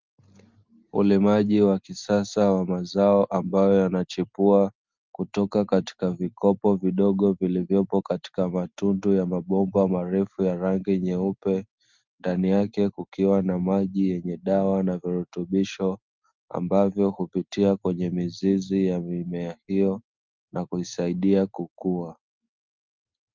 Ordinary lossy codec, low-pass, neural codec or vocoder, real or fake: Opus, 32 kbps; 7.2 kHz; none; real